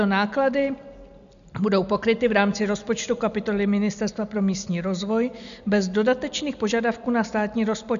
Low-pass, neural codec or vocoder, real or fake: 7.2 kHz; none; real